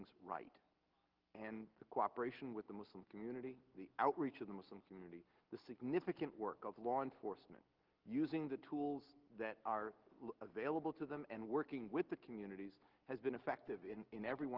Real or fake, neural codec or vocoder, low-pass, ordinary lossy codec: real; none; 5.4 kHz; Opus, 16 kbps